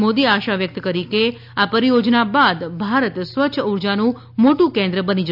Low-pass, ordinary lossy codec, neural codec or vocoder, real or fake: 5.4 kHz; none; none; real